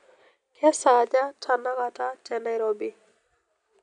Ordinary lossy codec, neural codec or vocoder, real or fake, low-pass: none; none; real; 9.9 kHz